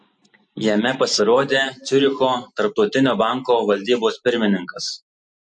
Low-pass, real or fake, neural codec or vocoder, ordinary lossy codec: 10.8 kHz; real; none; MP3, 48 kbps